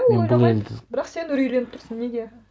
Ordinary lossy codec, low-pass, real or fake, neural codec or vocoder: none; none; real; none